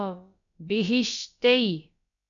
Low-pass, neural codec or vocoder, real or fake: 7.2 kHz; codec, 16 kHz, about 1 kbps, DyCAST, with the encoder's durations; fake